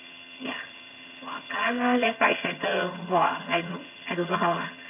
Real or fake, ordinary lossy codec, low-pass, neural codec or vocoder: fake; none; 3.6 kHz; vocoder, 22.05 kHz, 80 mel bands, HiFi-GAN